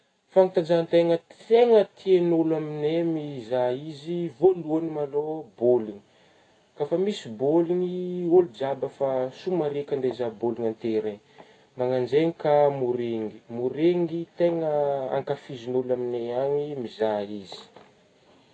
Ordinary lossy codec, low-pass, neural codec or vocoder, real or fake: AAC, 32 kbps; 9.9 kHz; none; real